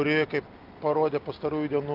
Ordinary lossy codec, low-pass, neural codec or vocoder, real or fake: Opus, 24 kbps; 5.4 kHz; none; real